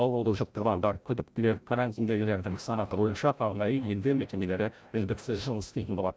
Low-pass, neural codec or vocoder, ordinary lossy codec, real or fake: none; codec, 16 kHz, 0.5 kbps, FreqCodec, larger model; none; fake